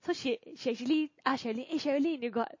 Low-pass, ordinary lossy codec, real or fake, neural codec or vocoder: 7.2 kHz; MP3, 32 kbps; real; none